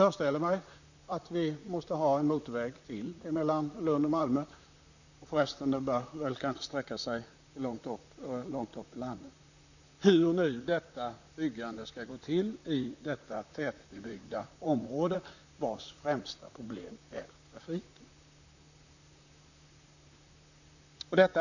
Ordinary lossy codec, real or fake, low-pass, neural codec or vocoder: none; fake; 7.2 kHz; vocoder, 44.1 kHz, 128 mel bands, Pupu-Vocoder